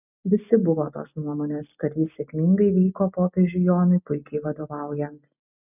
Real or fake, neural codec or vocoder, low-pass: real; none; 3.6 kHz